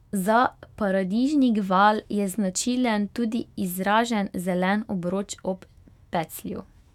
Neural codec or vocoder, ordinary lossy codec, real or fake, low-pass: autoencoder, 48 kHz, 128 numbers a frame, DAC-VAE, trained on Japanese speech; none; fake; 19.8 kHz